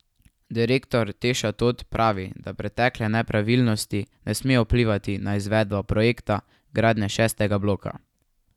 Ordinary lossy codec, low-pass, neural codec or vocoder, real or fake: none; 19.8 kHz; none; real